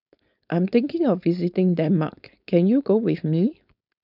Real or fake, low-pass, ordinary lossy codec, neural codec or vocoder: fake; 5.4 kHz; none; codec, 16 kHz, 4.8 kbps, FACodec